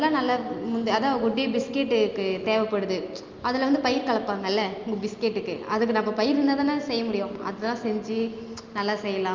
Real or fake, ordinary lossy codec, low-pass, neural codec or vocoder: real; Opus, 24 kbps; 7.2 kHz; none